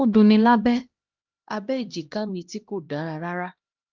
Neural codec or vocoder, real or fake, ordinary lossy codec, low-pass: codec, 16 kHz, 1 kbps, X-Codec, HuBERT features, trained on LibriSpeech; fake; Opus, 24 kbps; 7.2 kHz